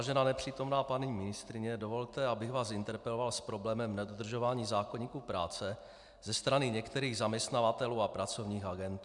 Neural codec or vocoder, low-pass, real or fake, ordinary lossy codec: none; 10.8 kHz; real; MP3, 96 kbps